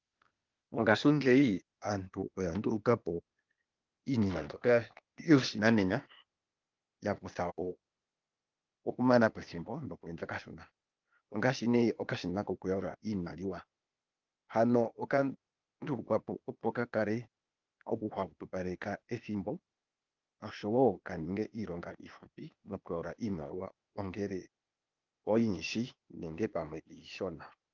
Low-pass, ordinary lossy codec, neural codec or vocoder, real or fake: 7.2 kHz; Opus, 24 kbps; codec, 16 kHz, 0.8 kbps, ZipCodec; fake